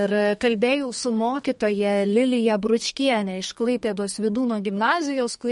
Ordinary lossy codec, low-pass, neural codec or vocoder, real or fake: MP3, 48 kbps; 14.4 kHz; codec, 32 kHz, 1.9 kbps, SNAC; fake